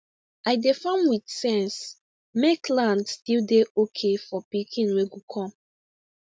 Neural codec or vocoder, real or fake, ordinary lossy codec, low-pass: none; real; none; none